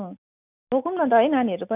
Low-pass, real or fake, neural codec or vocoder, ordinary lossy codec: 3.6 kHz; real; none; none